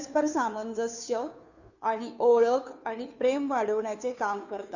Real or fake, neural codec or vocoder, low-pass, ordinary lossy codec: fake; codec, 16 kHz, 2 kbps, FunCodec, trained on Chinese and English, 25 frames a second; 7.2 kHz; none